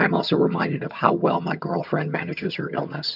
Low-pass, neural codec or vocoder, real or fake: 5.4 kHz; vocoder, 22.05 kHz, 80 mel bands, HiFi-GAN; fake